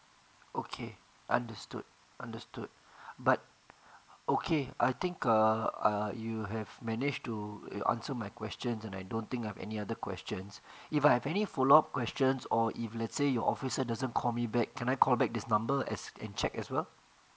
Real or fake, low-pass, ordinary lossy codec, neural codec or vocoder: real; none; none; none